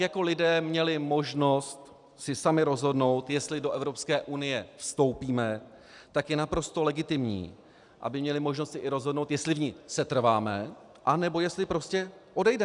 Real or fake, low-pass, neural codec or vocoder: real; 10.8 kHz; none